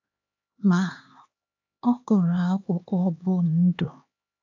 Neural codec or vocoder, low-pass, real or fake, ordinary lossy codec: codec, 16 kHz, 2 kbps, X-Codec, HuBERT features, trained on LibriSpeech; 7.2 kHz; fake; AAC, 48 kbps